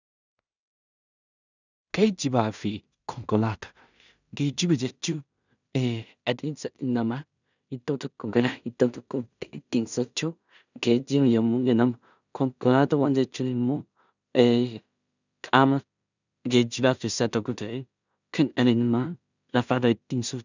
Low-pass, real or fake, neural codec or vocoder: 7.2 kHz; fake; codec, 16 kHz in and 24 kHz out, 0.4 kbps, LongCat-Audio-Codec, two codebook decoder